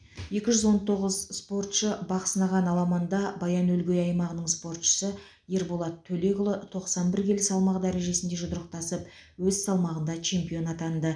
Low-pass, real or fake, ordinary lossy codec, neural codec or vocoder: 9.9 kHz; real; none; none